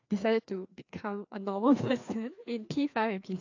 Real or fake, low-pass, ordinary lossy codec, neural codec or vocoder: fake; 7.2 kHz; AAC, 48 kbps; codec, 16 kHz, 2 kbps, FreqCodec, larger model